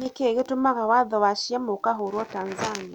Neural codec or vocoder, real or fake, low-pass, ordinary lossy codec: none; real; 19.8 kHz; none